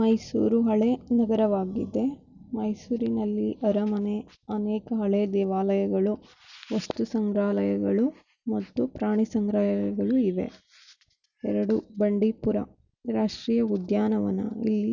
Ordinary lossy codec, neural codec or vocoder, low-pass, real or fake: none; none; 7.2 kHz; real